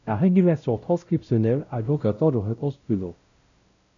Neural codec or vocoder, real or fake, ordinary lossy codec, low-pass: codec, 16 kHz, 0.5 kbps, X-Codec, WavLM features, trained on Multilingual LibriSpeech; fake; MP3, 96 kbps; 7.2 kHz